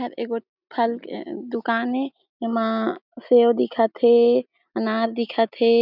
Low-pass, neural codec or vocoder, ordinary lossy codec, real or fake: 5.4 kHz; none; none; real